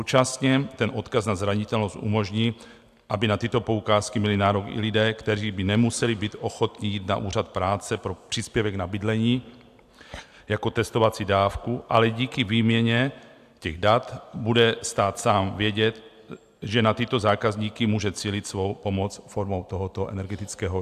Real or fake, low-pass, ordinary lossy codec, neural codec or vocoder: real; 14.4 kHz; AAC, 96 kbps; none